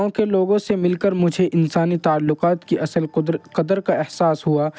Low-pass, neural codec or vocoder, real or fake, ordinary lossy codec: none; none; real; none